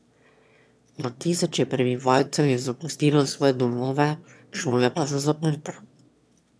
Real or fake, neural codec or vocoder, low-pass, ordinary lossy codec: fake; autoencoder, 22.05 kHz, a latent of 192 numbers a frame, VITS, trained on one speaker; none; none